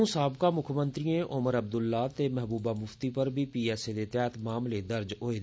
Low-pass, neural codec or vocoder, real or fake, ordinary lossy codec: none; none; real; none